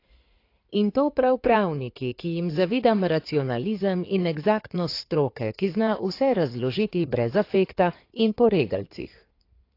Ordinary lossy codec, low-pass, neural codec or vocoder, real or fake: AAC, 32 kbps; 5.4 kHz; codec, 16 kHz in and 24 kHz out, 2.2 kbps, FireRedTTS-2 codec; fake